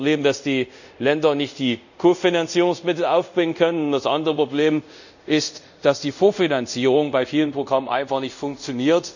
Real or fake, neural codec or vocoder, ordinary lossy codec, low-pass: fake; codec, 24 kHz, 0.5 kbps, DualCodec; none; 7.2 kHz